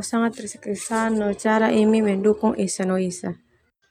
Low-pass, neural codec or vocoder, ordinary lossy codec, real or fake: 19.8 kHz; none; none; real